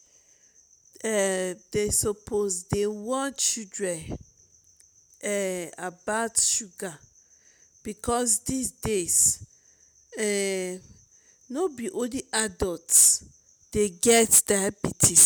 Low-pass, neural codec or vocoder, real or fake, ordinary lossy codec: none; none; real; none